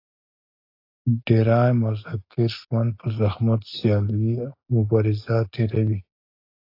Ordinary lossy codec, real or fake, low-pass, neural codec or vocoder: AAC, 32 kbps; real; 5.4 kHz; none